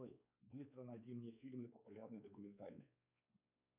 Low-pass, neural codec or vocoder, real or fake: 3.6 kHz; codec, 16 kHz, 4 kbps, X-Codec, WavLM features, trained on Multilingual LibriSpeech; fake